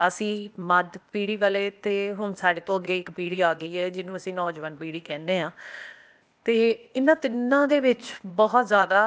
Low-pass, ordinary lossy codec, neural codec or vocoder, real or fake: none; none; codec, 16 kHz, 0.8 kbps, ZipCodec; fake